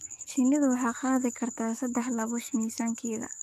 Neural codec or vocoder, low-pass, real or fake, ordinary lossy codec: codec, 44.1 kHz, 7.8 kbps, DAC; 14.4 kHz; fake; none